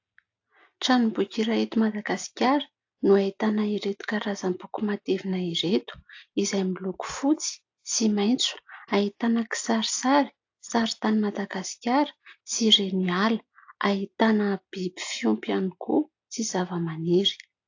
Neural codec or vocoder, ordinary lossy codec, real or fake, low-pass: none; AAC, 48 kbps; real; 7.2 kHz